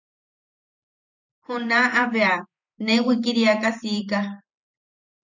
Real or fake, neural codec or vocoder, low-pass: fake; vocoder, 44.1 kHz, 128 mel bands every 512 samples, BigVGAN v2; 7.2 kHz